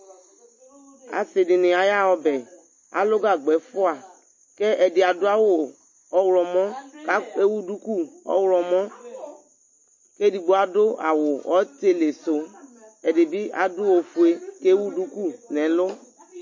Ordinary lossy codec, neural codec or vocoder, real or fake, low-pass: MP3, 32 kbps; none; real; 7.2 kHz